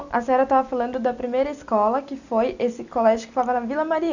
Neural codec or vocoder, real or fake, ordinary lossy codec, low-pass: none; real; none; 7.2 kHz